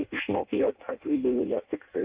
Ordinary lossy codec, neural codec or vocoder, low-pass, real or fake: Opus, 32 kbps; codec, 16 kHz in and 24 kHz out, 0.6 kbps, FireRedTTS-2 codec; 3.6 kHz; fake